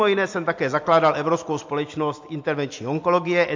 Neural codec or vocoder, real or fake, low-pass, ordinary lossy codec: none; real; 7.2 kHz; MP3, 48 kbps